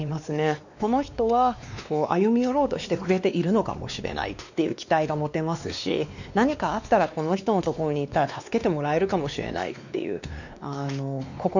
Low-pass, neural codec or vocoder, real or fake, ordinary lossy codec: 7.2 kHz; codec, 16 kHz, 2 kbps, X-Codec, WavLM features, trained on Multilingual LibriSpeech; fake; none